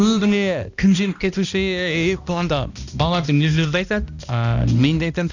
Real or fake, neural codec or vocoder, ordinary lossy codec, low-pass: fake; codec, 16 kHz, 1 kbps, X-Codec, HuBERT features, trained on balanced general audio; none; 7.2 kHz